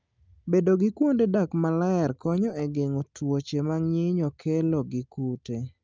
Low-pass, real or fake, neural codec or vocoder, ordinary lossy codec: 7.2 kHz; real; none; Opus, 24 kbps